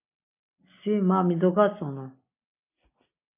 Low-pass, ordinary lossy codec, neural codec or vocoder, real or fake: 3.6 kHz; MP3, 24 kbps; none; real